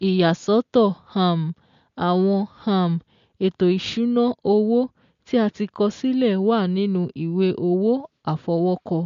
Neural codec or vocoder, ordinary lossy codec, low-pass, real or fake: none; MP3, 48 kbps; 7.2 kHz; real